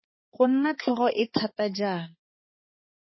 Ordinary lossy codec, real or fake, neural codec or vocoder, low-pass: MP3, 24 kbps; fake; codec, 16 kHz, 4 kbps, X-Codec, HuBERT features, trained on balanced general audio; 7.2 kHz